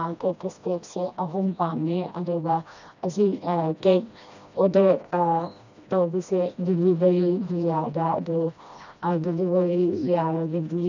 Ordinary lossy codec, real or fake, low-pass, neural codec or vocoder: none; fake; 7.2 kHz; codec, 16 kHz, 1 kbps, FreqCodec, smaller model